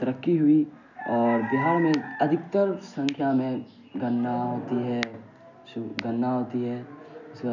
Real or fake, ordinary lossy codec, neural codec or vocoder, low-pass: real; none; none; 7.2 kHz